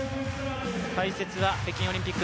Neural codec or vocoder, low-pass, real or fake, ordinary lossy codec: none; none; real; none